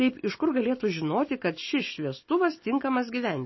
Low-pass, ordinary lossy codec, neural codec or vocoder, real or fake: 7.2 kHz; MP3, 24 kbps; none; real